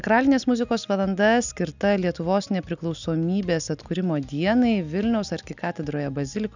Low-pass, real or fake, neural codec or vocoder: 7.2 kHz; real; none